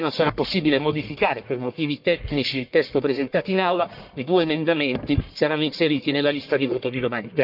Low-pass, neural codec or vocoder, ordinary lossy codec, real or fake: 5.4 kHz; codec, 44.1 kHz, 1.7 kbps, Pupu-Codec; none; fake